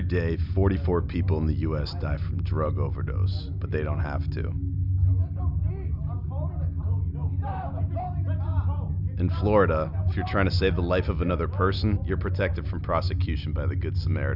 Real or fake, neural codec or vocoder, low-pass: real; none; 5.4 kHz